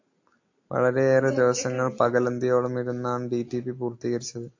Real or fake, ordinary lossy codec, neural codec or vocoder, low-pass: real; AAC, 48 kbps; none; 7.2 kHz